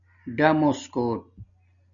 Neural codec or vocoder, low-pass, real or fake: none; 7.2 kHz; real